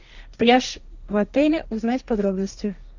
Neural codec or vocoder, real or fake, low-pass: codec, 16 kHz, 1.1 kbps, Voila-Tokenizer; fake; 7.2 kHz